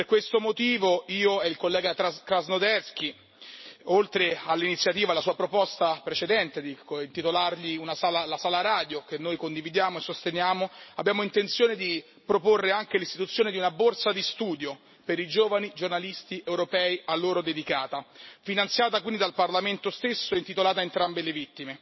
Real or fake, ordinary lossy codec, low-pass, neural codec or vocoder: real; MP3, 24 kbps; 7.2 kHz; none